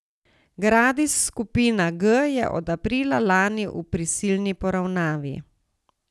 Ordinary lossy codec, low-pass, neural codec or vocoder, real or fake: none; none; none; real